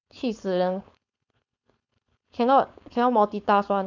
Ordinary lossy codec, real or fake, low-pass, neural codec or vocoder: none; fake; 7.2 kHz; codec, 16 kHz, 4.8 kbps, FACodec